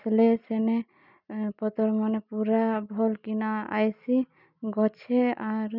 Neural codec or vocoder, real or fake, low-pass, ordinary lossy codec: none; real; 5.4 kHz; none